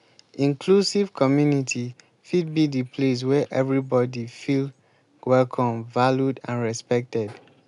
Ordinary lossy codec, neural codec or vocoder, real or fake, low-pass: none; none; real; 10.8 kHz